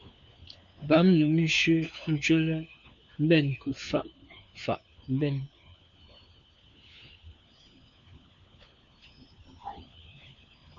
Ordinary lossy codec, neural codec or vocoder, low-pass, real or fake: MP3, 64 kbps; codec, 16 kHz, 4 kbps, FunCodec, trained on LibriTTS, 50 frames a second; 7.2 kHz; fake